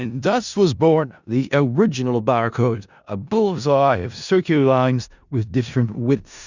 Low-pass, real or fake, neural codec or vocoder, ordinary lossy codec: 7.2 kHz; fake; codec, 16 kHz in and 24 kHz out, 0.4 kbps, LongCat-Audio-Codec, four codebook decoder; Opus, 64 kbps